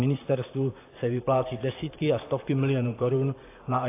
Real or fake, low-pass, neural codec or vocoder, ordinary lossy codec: fake; 3.6 kHz; vocoder, 44.1 kHz, 128 mel bands, Pupu-Vocoder; AAC, 24 kbps